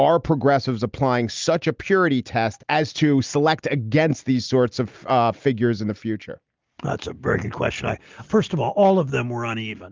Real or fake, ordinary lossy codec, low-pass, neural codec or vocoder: real; Opus, 24 kbps; 7.2 kHz; none